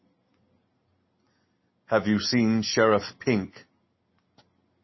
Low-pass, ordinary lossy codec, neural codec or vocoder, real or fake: 7.2 kHz; MP3, 24 kbps; none; real